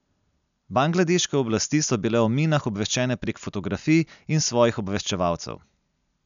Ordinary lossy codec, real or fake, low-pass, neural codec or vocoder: none; real; 7.2 kHz; none